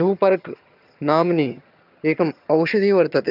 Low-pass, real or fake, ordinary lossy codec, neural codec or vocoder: 5.4 kHz; fake; none; vocoder, 22.05 kHz, 80 mel bands, HiFi-GAN